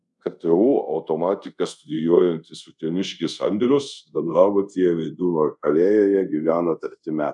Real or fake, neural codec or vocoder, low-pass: fake; codec, 24 kHz, 0.5 kbps, DualCodec; 10.8 kHz